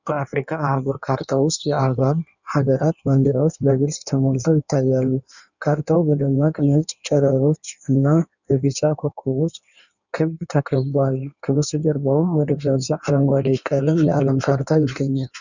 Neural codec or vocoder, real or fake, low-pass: codec, 16 kHz in and 24 kHz out, 1.1 kbps, FireRedTTS-2 codec; fake; 7.2 kHz